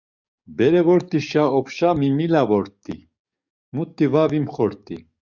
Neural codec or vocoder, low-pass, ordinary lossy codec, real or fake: codec, 44.1 kHz, 7.8 kbps, DAC; 7.2 kHz; Opus, 64 kbps; fake